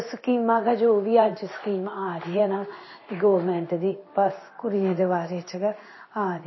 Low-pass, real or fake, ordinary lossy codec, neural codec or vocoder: 7.2 kHz; fake; MP3, 24 kbps; codec, 16 kHz in and 24 kHz out, 1 kbps, XY-Tokenizer